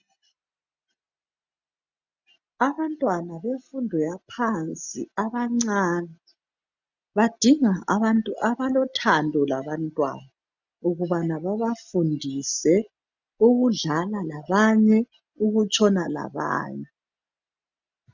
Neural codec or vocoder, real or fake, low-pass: none; real; 7.2 kHz